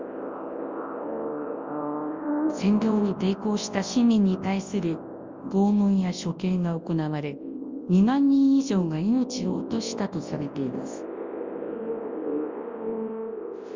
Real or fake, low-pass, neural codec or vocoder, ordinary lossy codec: fake; 7.2 kHz; codec, 24 kHz, 0.9 kbps, WavTokenizer, large speech release; Opus, 32 kbps